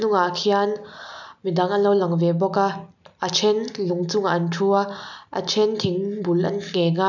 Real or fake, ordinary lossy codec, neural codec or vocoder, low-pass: real; none; none; 7.2 kHz